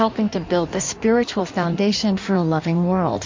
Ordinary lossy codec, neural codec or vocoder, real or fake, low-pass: MP3, 48 kbps; codec, 16 kHz in and 24 kHz out, 1.1 kbps, FireRedTTS-2 codec; fake; 7.2 kHz